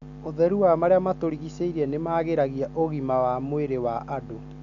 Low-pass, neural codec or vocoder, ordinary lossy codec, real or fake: 7.2 kHz; none; none; real